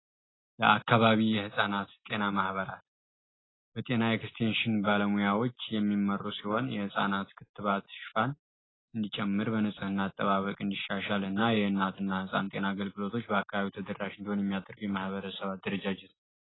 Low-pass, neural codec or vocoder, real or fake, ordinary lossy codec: 7.2 kHz; none; real; AAC, 16 kbps